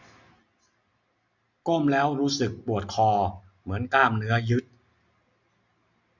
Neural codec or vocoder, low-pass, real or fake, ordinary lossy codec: none; 7.2 kHz; real; none